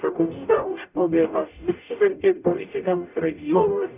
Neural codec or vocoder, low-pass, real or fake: codec, 44.1 kHz, 0.9 kbps, DAC; 3.6 kHz; fake